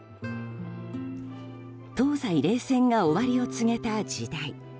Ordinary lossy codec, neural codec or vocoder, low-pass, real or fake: none; none; none; real